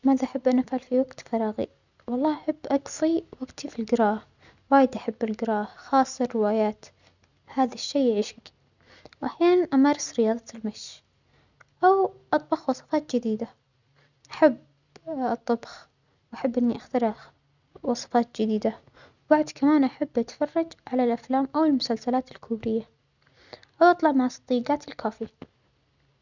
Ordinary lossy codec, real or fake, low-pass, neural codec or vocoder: none; real; 7.2 kHz; none